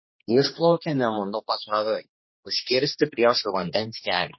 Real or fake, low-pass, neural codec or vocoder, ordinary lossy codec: fake; 7.2 kHz; codec, 16 kHz, 2 kbps, X-Codec, HuBERT features, trained on general audio; MP3, 24 kbps